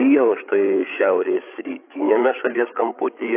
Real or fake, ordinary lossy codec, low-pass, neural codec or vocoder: fake; AAC, 24 kbps; 3.6 kHz; codec, 16 kHz, 8 kbps, FreqCodec, larger model